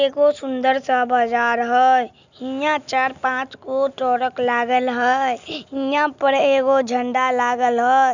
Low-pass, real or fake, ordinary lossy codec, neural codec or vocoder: 7.2 kHz; real; none; none